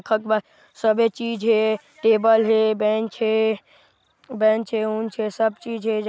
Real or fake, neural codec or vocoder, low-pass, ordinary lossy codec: real; none; none; none